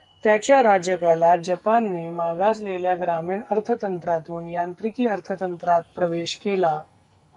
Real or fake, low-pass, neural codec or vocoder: fake; 10.8 kHz; codec, 44.1 kHz, 2.6 kbps, SNAC